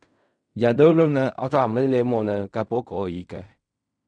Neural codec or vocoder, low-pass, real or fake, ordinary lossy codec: codec, 16 kHz in and 24 kHz out, 0.4 kbps, LongCat-Audio-Codec, fine tuned four codebook decoder; 9.9 kHz; fake; none